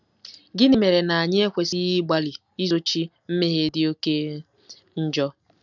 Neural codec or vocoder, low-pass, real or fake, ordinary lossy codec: none; 7.2 kHz; real; none